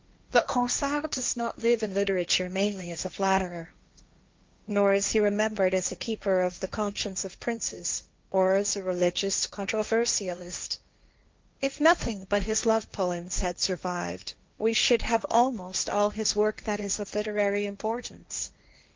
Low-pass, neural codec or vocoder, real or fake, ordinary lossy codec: 7.2 kHz; codec, 16 kHz, 1.1 kbps, Voila-Tokenizer; fake; Opus, 24 kbps